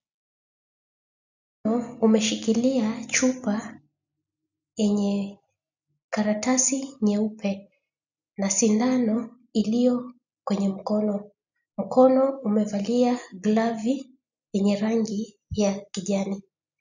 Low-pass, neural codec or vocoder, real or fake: 7.2 kHz; none; real